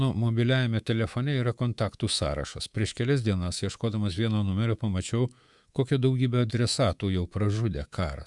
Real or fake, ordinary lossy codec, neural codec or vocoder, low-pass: fake; Opus, 64 kbps; codec, 24 kHz, 3.1 kbps, DualCodec; 10.8 kHz